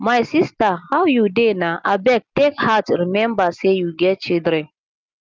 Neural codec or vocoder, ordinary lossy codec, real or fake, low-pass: none; Opus, 24 kbps; real; 7.2 kHz